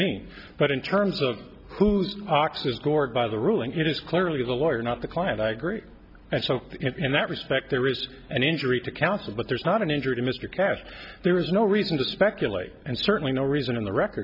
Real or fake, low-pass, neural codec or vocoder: real; 5.4 kHz; none